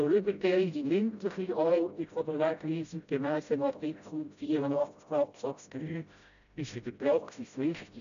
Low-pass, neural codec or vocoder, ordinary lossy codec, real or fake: 7.2 kHz; codec, 16 kHz, 0.5 kbps, FreqCodec, smaller model; none; fake